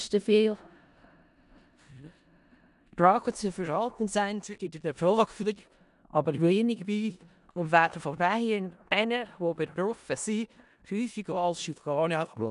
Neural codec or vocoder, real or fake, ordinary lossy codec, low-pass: codec, 16 kHz in and 24 kHz out, 0.4 kbps, LongCat-Audio-Codec, four codebook decoder; fake; none; 10.8 kHz